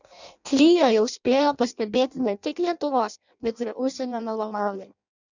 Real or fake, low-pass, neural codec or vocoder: fake; 7.2 kHz; codec, 16 kHz in and 24 kHz out, 0.6 kbps, FireRedTTS-2 codec